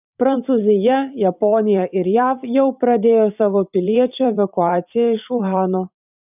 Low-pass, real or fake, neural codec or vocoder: 3.6 kHz; fake; vocoder, 44.1 kHz, 128 mel bands every 512 samples, BigVGAN v2